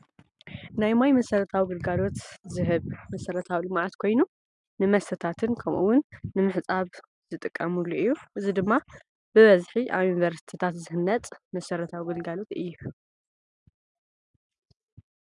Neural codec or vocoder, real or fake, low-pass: none; real; 10.8 kHz